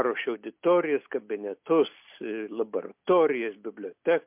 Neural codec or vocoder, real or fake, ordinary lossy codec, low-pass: none; real; MP3, 32 kbps; 3.6 kHz